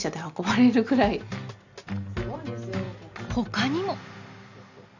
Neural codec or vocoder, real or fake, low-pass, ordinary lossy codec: none; real; 7.2 kHz; none